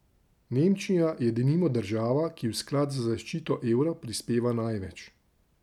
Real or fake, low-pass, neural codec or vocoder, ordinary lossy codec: real; 19.8 kHz; none; none